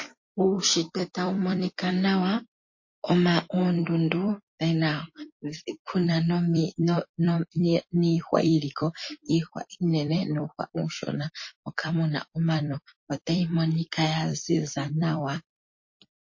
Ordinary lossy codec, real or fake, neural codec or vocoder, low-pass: MP3, 32 kbps; fake; vocoder, 44.1 kHz, 128 mel bands every 256 samples, BigVGAN v2; 7.2 kHz